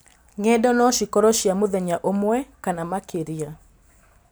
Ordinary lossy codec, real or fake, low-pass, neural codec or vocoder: none; real; none; none